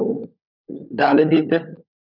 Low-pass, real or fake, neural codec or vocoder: 5.4 kHz; fake; codec, 16 kHz, 16 kbps, FunCodec, trained on LibriTTS, 50 frames a second